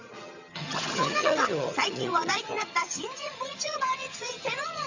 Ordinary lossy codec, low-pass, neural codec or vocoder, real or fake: Opus, 64 kbps; 7.2 kHz; vocoder, 22.05 kHz, 80 mel bands, HiFi-GAN; fake